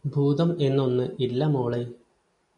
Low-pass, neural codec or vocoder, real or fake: 10.8 kHz; none; real